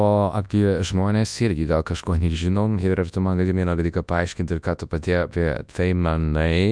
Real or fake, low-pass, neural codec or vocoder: fake; 9.9 kHz; codec, 24 kHz, 0.9 kbps, WavTokenizer, large speech release